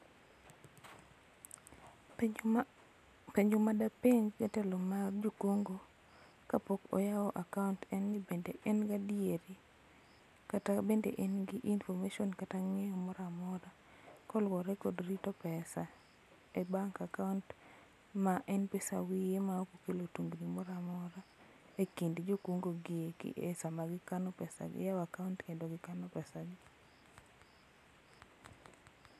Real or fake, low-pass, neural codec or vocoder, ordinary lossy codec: real; 14.4 kHz; none; none